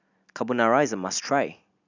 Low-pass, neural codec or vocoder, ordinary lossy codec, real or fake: 7.2 kHz; none; none; real